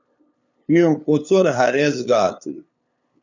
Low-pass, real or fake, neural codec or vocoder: 7.2 kHz; fake; codec, 16 kHz, 2 kbps, FunCodec, trained on LibriTTS, 25 frames a second